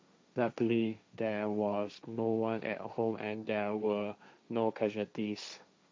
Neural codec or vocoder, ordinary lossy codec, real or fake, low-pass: codec, 16 kHz, 1.1 kbps, Voila-Tokenizer; none; fake; none